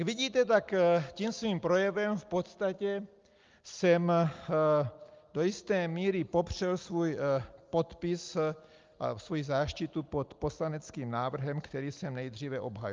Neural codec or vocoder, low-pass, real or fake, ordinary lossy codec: none; 7.2 kHz; real; Opus, 24 kbps